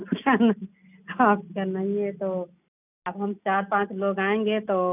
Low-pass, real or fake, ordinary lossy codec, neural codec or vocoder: 3.6 kHz; real; none; none